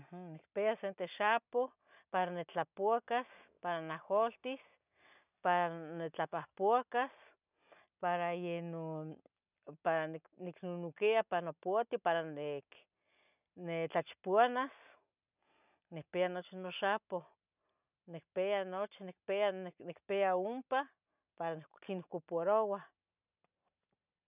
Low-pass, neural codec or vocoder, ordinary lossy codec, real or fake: 3.6 kHz; none; none; real